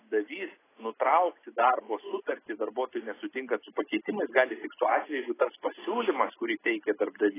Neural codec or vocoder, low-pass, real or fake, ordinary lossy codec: none; 3.6 kHz; real; AAC, 16 kbps